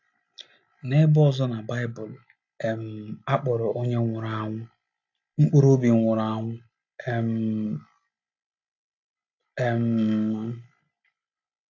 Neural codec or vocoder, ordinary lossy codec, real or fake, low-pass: none; AAC, 48 kbps; real; 7.2 kHz